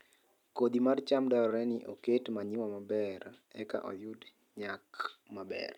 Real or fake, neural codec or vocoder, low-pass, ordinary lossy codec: real; none; 19.8 kHz; none